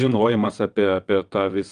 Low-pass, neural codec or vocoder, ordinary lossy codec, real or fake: 9.9 kHz; vocoder, 22.05 kHz, 80 mel bands, WaveNeXt; Opus, 32 kbps; fake